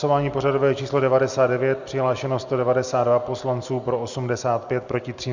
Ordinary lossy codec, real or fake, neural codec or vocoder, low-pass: Opus, 64 kbps; real; none; 7.2 kHz